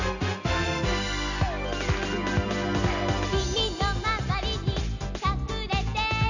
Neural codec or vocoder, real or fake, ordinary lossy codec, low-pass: none; real; none; 7.2 kHz